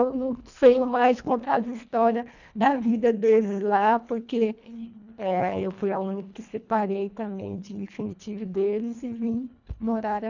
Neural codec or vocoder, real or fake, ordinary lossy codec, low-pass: codec, 24 kHz, 1.5 kbps, HILCodec; fake; none; 7.2 kHz